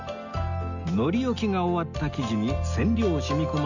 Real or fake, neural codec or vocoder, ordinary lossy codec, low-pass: real; none; none; 7.2 kHz